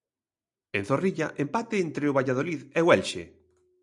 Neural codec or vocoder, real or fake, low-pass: none; real; 10.8 kHz